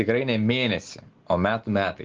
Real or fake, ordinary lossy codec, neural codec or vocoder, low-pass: real; Opus, 16 kbps; none; 7.2 kHz